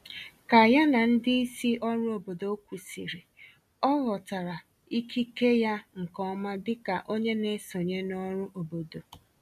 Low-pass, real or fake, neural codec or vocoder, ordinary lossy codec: 14.4 kHz; real; none; MP3, 96 kbps